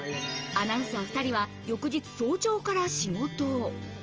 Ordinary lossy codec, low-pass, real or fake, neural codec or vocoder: Opus, 24 kbps; 7.2 kHz; real; none